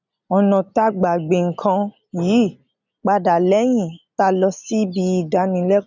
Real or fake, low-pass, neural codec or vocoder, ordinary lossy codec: real; 7.2 kHz; none; none